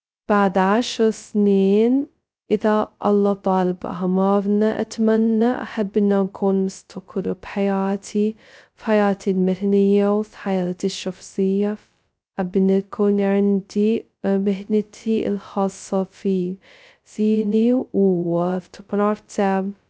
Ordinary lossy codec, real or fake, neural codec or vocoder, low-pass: none; fake; codec, 16 kHz, 0.2 kbps, FocalCodec; none